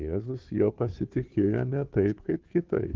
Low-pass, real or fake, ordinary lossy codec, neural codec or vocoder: 7.2 kHz; fake; Opus, 16 kbps; codec, 16 kHz, 2 kbps, FunCodec, trained on Chinese and English, 25 frames a second